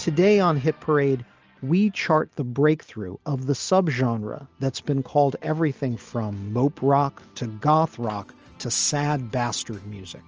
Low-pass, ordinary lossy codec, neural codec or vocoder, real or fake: 7.2 kHz; Opus, 24 kbps; none; real